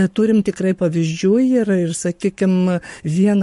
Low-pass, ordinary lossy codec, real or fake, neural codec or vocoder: 14.4 kHz; MP3, 48 kbps; fake; codec, 44.1 kHz, 7.8 kbps, Pupu-Codec